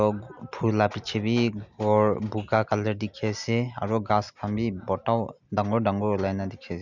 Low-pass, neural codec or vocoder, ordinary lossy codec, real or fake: 7.2 kHz; none; none; real